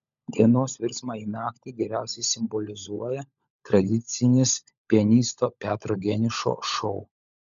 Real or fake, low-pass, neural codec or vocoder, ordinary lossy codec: fake; 7.2 kHz; codec, 16 kHz, 16 kbps, FunCodec, trained on LibriTTS, 50 frames a second; MP3, 96 kbps